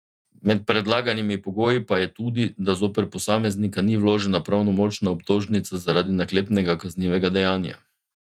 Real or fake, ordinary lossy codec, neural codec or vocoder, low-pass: fake; none; vocoder, 48 kHz, 128 mel bands, Vocos; 19.8 kHz